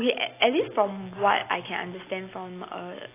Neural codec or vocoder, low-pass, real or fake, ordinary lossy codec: none; 3.6 kHz; real; AAC, 24 kbps